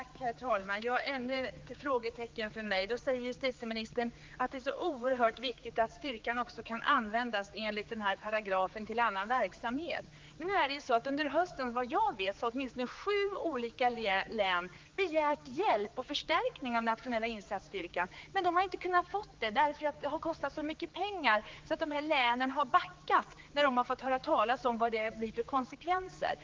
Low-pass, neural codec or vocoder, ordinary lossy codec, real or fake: 7.2 kHz; codec, 16 kHz, 4 kbps, X-Codec, HuBERT features, trained on general audio; Opus, 32 kbps; fake